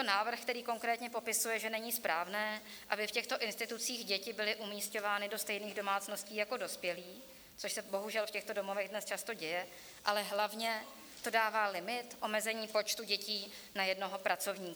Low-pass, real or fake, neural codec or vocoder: 19.8 kHz; real; none